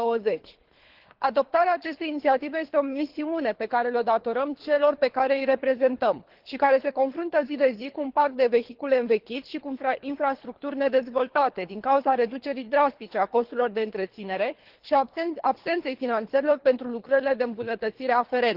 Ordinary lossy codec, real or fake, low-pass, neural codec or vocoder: Opus, 16 kbps; fake; 5.4 kHz; codec, 24 kHz, 3 kbps, HILCodec